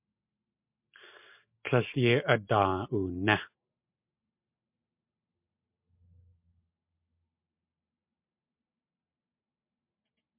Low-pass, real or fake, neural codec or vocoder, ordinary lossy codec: 3.6 kHz; real; none; MP3, 32 kbps